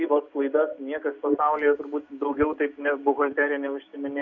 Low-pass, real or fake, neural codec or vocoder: 7.2 kHz; real; none